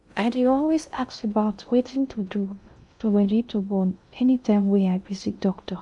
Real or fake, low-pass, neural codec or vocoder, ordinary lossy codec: fake; 10.8 kHz; codec, 16 kHz in and 24 kHz out, 0.6 kbps, FocalCodec, streaming, 2048 codes; none